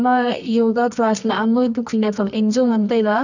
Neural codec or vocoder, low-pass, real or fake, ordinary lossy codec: codec, 24 kHz, 0.9 kbps, WavTokenizer, medium music audio release; 7.2 kHz; fake; none